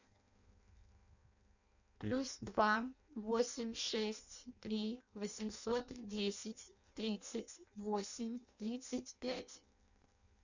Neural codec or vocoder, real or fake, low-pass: codec, 16 kHz in and 24 kHz out, 0.6 kbps, FireRedTTS-2 codec; fake; 7.2 kHz